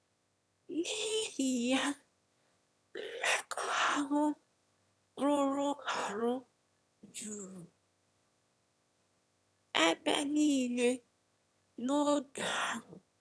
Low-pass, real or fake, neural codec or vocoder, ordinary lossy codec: none; fake; autoencoder, 22.05 kHz, a latent of 192 numbers a frame, VITS, trained on one speaker; none